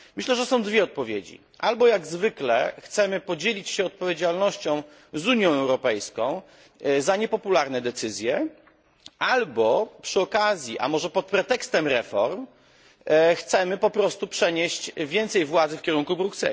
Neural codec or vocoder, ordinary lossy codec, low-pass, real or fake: none; none; none; real